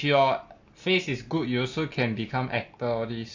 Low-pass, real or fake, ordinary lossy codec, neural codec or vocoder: 7.2 kHz; real; AAC, 48 kbps; none